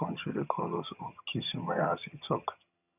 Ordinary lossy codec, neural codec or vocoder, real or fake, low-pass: none; vocoder, 22.05 kHz, 80 mel bands, HiFi-GAN; fake; 3.6 kHz